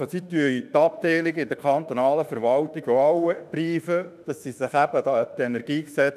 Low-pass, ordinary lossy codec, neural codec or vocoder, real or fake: 14.4 kHz; none; autoencoder, 48 kHz, 32 numbers a frame, DAC-VAE, trained on Japanese speech; fake